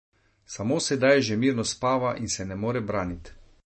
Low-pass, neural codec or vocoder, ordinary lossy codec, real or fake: 10.8 kHz; none; MP3, 32 kbps; real